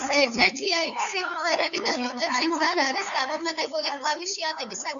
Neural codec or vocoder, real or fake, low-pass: codec, 16 kHz, 2 kbps, FunCodec, trained on LibriTTS, 25 frames a second; fake; 7.2 kHz